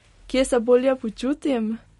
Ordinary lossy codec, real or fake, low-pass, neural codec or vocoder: MP3, 48 kbps; real; 19.8 kHz; none